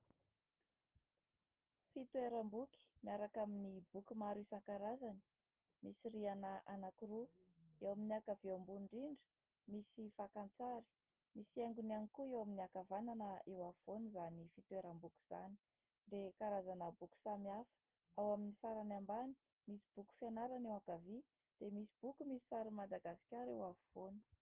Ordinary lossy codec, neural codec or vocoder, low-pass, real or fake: Opus, 16 kbps; none; 3.6 kHz; real